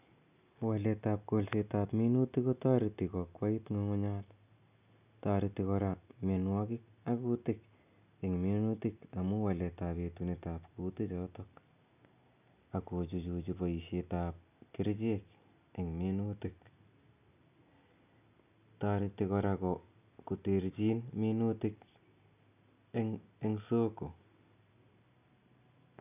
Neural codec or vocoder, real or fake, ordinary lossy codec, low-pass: none; real; none; 3.6 kHz